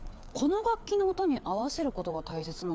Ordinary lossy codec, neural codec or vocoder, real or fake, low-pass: none; codec, 16 kHz, 4 kbps, FreqCodec, larger model; fake; none